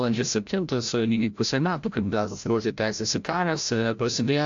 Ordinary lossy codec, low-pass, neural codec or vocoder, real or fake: AAC, 64 kbps; 7.2 kHz; codec, 16 kHz, 0.5 kbps, FreqCodec, larger model; fake